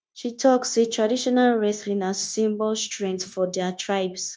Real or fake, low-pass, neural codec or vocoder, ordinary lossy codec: fake; none; codec, 16 kHz, 0.9 kbps, LongCat-Audio-Codec; none